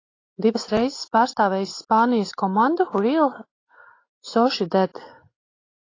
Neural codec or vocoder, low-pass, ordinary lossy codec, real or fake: none; 7.2 kHz; AAC, 32 kbps; real